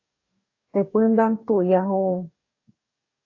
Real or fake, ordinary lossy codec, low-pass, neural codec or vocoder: fake; AAC, 32 kbps; 7.2 kHz; codec, 44.1 kHz, 2.6 kbps, DAC